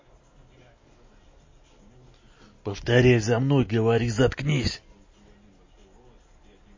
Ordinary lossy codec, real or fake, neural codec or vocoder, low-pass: MP3, 32 kbps; real; none; 7.2 kHz